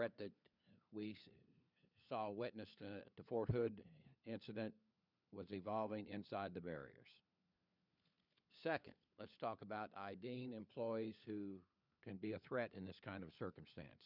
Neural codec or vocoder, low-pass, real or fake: codec, 16 kHz, 4 kbps, FunCodec, trained on LibriTTS, 50 frames a second; 5.4 kHz; fake